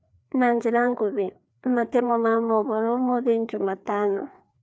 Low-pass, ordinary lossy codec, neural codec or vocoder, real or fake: none; none; codec, 16 kHz, 2 kbps, FreqCodec, larger model; fake